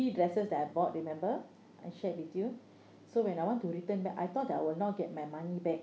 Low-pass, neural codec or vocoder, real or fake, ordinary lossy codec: none; none; real; none